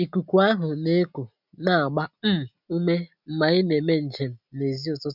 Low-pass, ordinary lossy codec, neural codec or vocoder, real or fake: 5.4 kHz; none; none; real